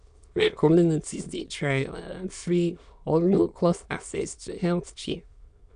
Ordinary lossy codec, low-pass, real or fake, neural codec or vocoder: none; 9.9 kHz; fake; autoencoder, 22.05 kHz, a latent of 192 numbers a frame, VITS, trained on many speakers